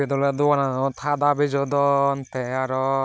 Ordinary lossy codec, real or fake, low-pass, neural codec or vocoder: none; real; none; none